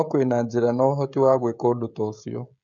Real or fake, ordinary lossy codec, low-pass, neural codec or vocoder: fake; none; 7.2 kHz; codec, 16 kHz, 16 kbps, FreqCodec, smaller model